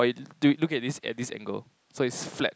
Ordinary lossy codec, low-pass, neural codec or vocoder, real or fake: none; none; none; real